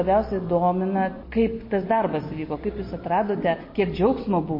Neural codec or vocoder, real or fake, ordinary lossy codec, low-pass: none; real; MP3, 24 kbps; 5.4 kHz